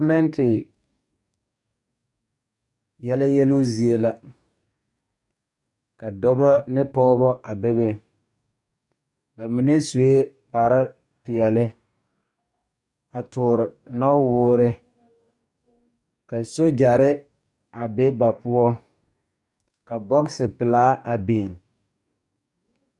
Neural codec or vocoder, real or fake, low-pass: codec, 44.1 kHz, 2.6 kbps, DAC; fake; 10.8 kHz